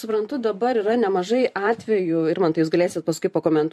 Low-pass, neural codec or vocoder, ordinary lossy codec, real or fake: 14.4 kHz; vocoder, 44.1 kHz, 128 mel bands every 512 samples, BigVGAN v2; MP3, 64 kbps; fake